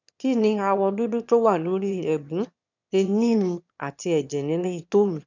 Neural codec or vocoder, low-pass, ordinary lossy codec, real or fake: autoencoder, 22.05 kHz, a latent of 192 numbers a frame, VITS, trained on one speaker; 7.2 kHz; none; fake